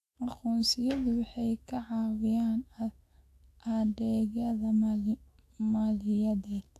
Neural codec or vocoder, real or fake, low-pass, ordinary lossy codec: autoencoder, 48 kHz, 128 numbers a frame, DAC-VAE, trained on Japanese speech; fake; 14.4 kHz; AAC, 64 kbps